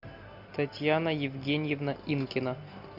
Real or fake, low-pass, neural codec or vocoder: real; 5.4 kHz; none